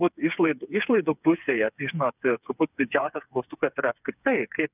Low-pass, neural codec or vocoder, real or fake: 3.6 kHz; codec, 16 kHz, 4 kbps, FreqCodec, smaller model; fake